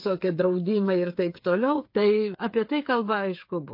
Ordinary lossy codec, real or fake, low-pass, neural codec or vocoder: MP3, 32 kbps; fake; 5.4 kHz; codec, 16 kHz, 8 kbps, FreqCodec, smaller model